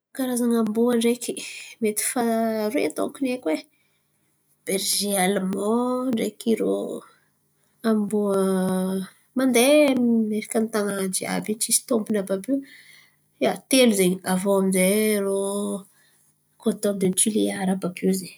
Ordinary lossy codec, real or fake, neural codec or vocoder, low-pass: none; real; none; none